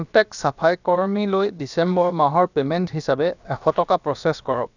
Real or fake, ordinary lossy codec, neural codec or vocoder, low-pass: fake; none; codec, 16 kHz, about 1 kbps, DyCAST, with the encoder's durations; 7.2 kHz